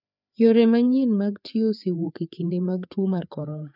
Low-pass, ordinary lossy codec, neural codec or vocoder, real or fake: 5.4 kHz; none; codec, 16 kHz, 4 kbps, FreqCodec, larger model; fake